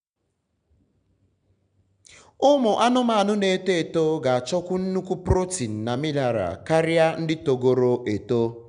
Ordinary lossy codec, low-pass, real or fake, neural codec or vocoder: none; 9.9 kHz; real; none